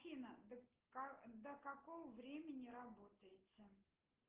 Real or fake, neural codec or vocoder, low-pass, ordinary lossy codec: real; none; 3.6 kHz; Opus, 16 kbps